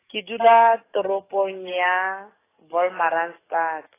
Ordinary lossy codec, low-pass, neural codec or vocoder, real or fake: AAC, 16 kbps; 3.6 kHz; codec, 44.1 kHz, 7.8 kbps, DAC; fake